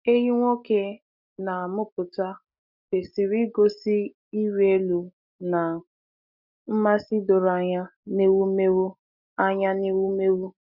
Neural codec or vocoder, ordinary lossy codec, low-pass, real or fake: none; none; 5.4 kHz; real